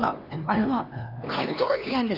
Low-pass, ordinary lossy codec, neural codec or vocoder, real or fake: 5.4 kHz; none; codec, 16 kHz, 2 kbps, X-Codec, HuBERT features, trained on LibriSpeech; fake